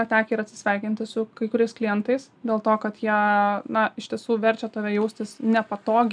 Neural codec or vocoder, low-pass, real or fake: none; 9.9 kHz; real